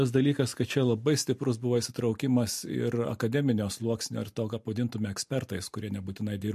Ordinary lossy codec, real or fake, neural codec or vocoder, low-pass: MP3, 64 kbps; real; none; 14.4 kHz